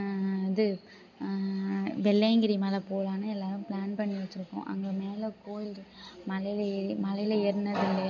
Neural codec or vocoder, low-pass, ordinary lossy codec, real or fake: none; 7.2 kHz; none; real